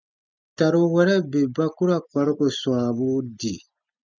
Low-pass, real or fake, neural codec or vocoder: 7.2 kHz; real; none